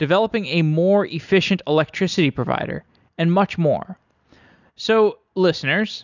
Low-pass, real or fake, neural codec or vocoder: 7.2 kHz; real; none